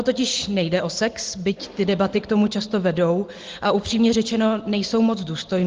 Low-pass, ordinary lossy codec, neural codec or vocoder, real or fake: 7.2 kHz; Opus, 16 kbps; none; real